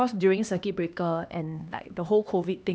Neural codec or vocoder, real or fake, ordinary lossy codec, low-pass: codec, 16 kHz, 2 kbps, X-Codec, HuBERT features, trained on LibriSpeech; fake; none; none